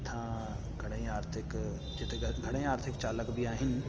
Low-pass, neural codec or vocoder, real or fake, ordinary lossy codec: 7.2 kHz; none; real; Opus, 24 kbps